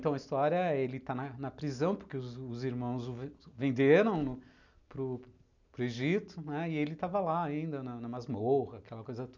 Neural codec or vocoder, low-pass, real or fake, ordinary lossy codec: none; 7.2 kHz; real; none